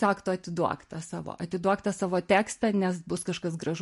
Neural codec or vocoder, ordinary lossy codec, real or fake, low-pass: none; MP3, 48 kbps; real; 14.4 kHz